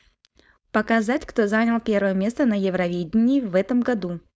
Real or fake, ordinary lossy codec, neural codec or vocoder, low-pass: fake; none; codec, 16 kHz, 4.8 kbps, FACodec; none